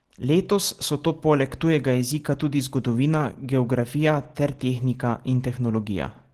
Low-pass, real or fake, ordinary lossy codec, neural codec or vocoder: 14.4 kHz; real; Opus, 16 kbps; none